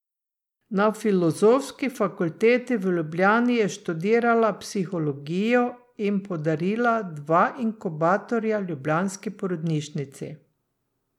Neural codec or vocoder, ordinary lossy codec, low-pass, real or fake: none; none; 19.8 kHz; real